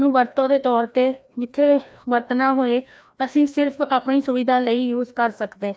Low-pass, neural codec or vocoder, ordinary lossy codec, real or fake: none; codec, 16 kHz, 1 kbps, FreqCodec, larger model; none; fake